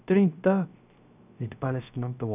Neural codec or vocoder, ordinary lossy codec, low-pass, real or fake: codec, 16 kHz, 0.3 kbps, FocalCodec; none; 3.6 kHz; fake